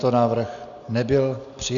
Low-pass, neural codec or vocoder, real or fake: 7.2 kHz; none; real